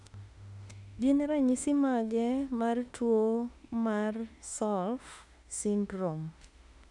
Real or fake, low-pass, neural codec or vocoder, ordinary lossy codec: fake; 10.8 kHz; autoencoder, 48 kHz, 32 numbers a frame, DAC-VAE, trained on Japanese speech; none